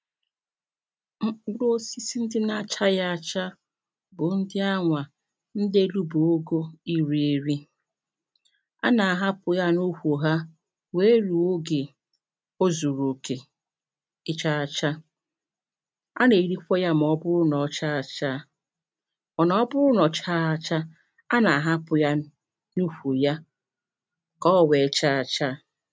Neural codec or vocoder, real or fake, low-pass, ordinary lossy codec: none; real; none; none